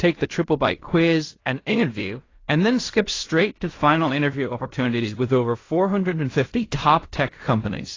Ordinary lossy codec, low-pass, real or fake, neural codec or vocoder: AAC, 32 kbps; 7.2 kHz; fake; codec, 16 kHz in and 24 kHz out, 0.4 kbps, LongCat-Audio-Codec, fine tuned four codebook decoder